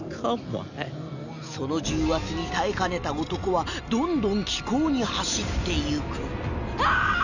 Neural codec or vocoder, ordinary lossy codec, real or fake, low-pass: none; none; real; 7.2 kHz